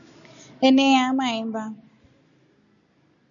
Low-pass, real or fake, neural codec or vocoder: 7.2 kHz; real; none